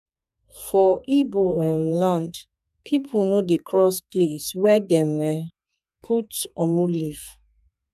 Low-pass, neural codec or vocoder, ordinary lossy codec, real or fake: 14.4 kHz; codec, 32 kHz, 1.9 kbps, SNAC; none; fake